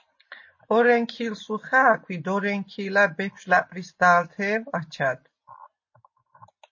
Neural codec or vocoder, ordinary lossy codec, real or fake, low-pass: none; MP3, 32 kbps; real; 7.2 kHz